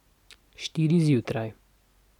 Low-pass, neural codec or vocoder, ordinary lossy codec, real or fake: 19.8 kHz; none; none; real